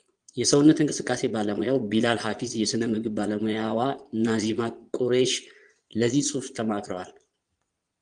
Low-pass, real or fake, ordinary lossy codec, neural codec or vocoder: 9.9 kHz; fake; Opus, 24 kbps; vocoder, 22.05 kHz, 80 mel bands, Vocos